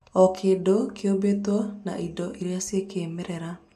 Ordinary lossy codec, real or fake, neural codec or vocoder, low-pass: none; real; none; 14.4 kHz